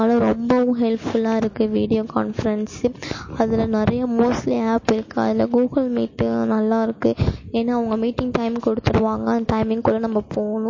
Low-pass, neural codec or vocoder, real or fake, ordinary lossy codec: 7.2 kHz; none; real; MP3, 32 kbps